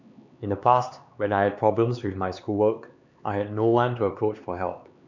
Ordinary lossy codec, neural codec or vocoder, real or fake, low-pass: none; codec, 16 kHz, 4 kbps, X-Codec, HuBERT features, trained on LibriSpeech; fake; 7.2 kHz